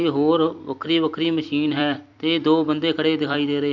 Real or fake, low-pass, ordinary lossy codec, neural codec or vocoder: fake; 7.2 kHz; none; vocoder, 44.1 kHz, 128 mel bands every 256 samples, BigVGAN v2